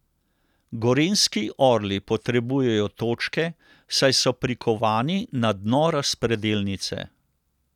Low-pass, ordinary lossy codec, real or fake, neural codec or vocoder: 19.8 kHz; none; real; none